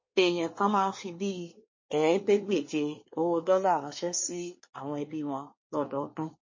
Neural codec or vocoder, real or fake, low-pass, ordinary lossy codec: codec, 24 kHz, 1 kbps, SNAC; fake; 7.2 kHz; MP3, 32 kbps